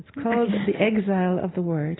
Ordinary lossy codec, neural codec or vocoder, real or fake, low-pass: AAC, 16 kbps; none; real; 7.2 kHz